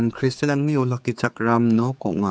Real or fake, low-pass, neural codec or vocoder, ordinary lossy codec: fake; none; codec, 16 kHz, 4 kbps, X-Codec, HuBERT features, trained on general audio; none